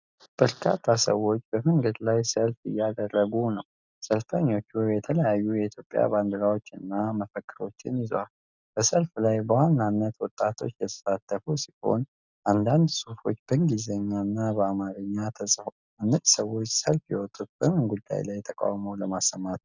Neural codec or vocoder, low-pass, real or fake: none; 7.2 kHz; real